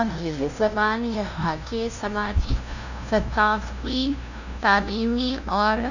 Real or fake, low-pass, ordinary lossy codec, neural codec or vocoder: fake; 7.2 kHz; none; codec, 16 kHz, 0.5 kbps, FunCodec, trained on LibriTTS, 25 frames a second